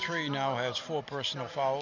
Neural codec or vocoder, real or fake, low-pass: none; real; 7.2 kHz